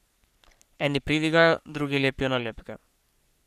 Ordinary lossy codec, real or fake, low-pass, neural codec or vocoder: none; fake; 14.4 kHz; codec, 44.1 kHz, 3.4 kbps, Pupu-Codec